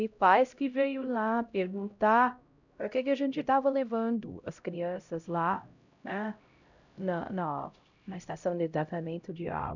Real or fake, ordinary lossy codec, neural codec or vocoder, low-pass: fake; none; codec, 16 kHz, 0.5 kbps, X-Codec, HuBERT features, trained on LibriSpeech; 7.2 kHz